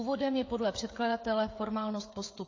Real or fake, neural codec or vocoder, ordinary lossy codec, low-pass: fake; codec, 16 kHz, 16 kbps, FreqCodec, smaller model; AAC, 32 kbps; 7.2 kHz